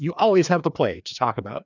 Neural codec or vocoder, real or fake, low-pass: codec, 16 kHz, 2 kbps, FreqCodec, larger model; fake; 7.2 kHz